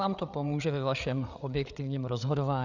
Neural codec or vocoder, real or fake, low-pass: codec, 16 kHz, 8 kbps, FreqCodec, larger model; fake; 7.2 kHz